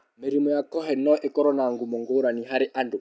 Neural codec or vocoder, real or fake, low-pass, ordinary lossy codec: none; real; none; none